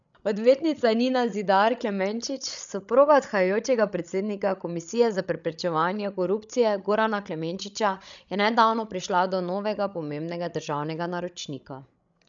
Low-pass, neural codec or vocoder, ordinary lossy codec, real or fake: 7.2 kHz; codec, 16 kHz, 16 kbps, FreqCodec, larger model; none; fake